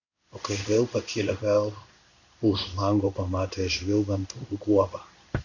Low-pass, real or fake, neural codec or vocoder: 7.2 kHz; fake; codec, 16 kHz in and 24 kHz out, 1 kbps, XY-Tokenizer